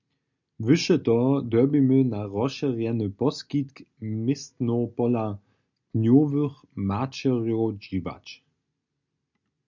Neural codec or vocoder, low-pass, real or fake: none; 7.2 kHz; real